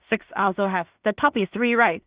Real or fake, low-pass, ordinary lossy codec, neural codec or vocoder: fake; 3.6 kHz; Opus, 64 kbps; codec, 16 kHz in and 24 kHz out, 0.4 kbps, LongCat-Audio-Codec, two codebook decoder